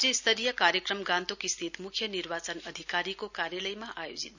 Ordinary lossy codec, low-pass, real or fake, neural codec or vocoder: none; 7.2 kHz; real; none